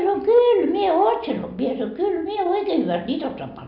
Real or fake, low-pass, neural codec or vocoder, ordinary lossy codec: real; 5.4 kHz; none; none